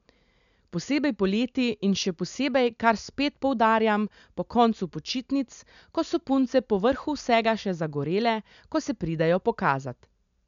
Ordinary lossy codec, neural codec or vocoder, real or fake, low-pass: none; none; real; 7.2 kHz